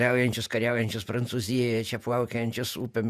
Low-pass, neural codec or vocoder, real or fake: 14.4 kHz; vocoder, 48 kHz, 128 mel bands, Vocos; fake